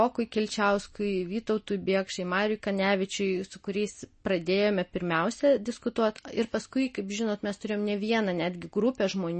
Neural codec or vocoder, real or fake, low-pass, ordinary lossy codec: none; real; 10.8 kHz; MP3, 32 kbps